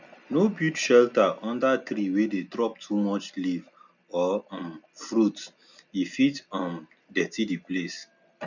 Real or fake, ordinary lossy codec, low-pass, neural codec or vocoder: real; none; 7.2 kHz; none